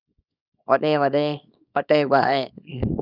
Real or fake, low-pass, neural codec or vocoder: fake; 5.4 kHz; codec, 24 kHz, 0.9 kbps, WavTokenizer, small release